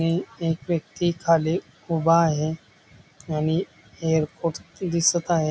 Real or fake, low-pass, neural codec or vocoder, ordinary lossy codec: real; none; none; none